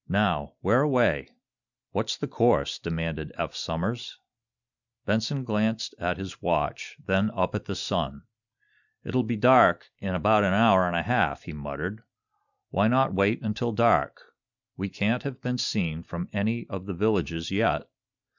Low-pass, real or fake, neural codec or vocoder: 7.2 kHz; real; none